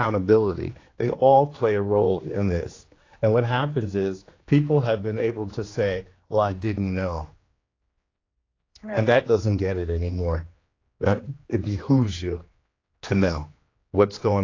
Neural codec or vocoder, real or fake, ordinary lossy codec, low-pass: codec, 16 kHz, 2 kbps, X-Codec, HuBERT features, trained on general audio; fake; AAC, 32 kbps; 7.2 kHz